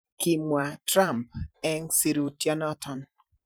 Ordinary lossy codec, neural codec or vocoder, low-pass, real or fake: none; vocoder, 44.1 kHz, 128 mel bands every 512 samples, BigVGAN v2; none; fake